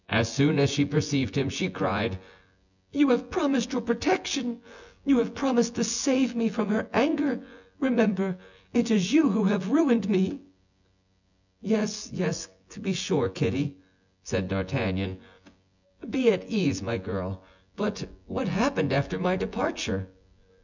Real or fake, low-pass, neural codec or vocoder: fake; 7.2 kHz; vocoder, 24 kHz, 100 mel bands, Vocos